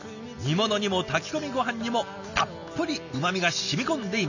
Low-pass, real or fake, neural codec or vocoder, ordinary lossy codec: 7.2 kHz; real; none; none